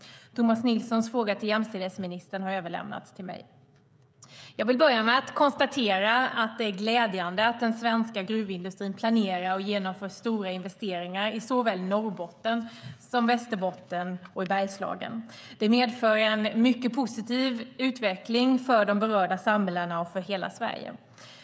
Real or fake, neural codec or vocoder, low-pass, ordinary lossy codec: fake; codec, 16 kHz, 16 kbps, FreqCodec, smaller model; none; none